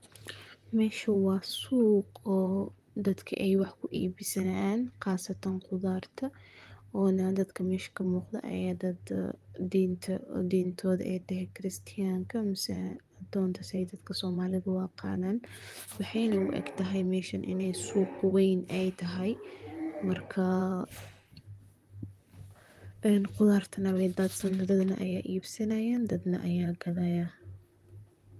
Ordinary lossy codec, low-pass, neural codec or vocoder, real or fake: Opus, 32 kbps; 14.4 kHz; vocoder, 44.1 kHz, 128 mel bands, Pupu-Vocoder; fake